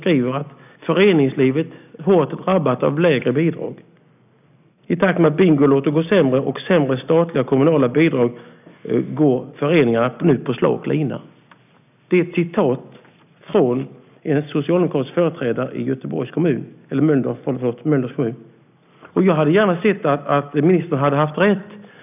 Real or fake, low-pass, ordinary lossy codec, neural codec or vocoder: real; 3.6 kHz; none; none